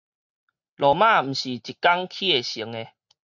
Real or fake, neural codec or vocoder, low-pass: real; none; 7.2 kHz